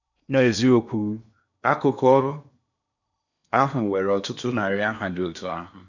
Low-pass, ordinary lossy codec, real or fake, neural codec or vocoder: 7.2 kHz; none; fake; codec, 16 kHz in and 24 kHz out, 0.8 kbps, FocalCodec, streaming, 65536 codes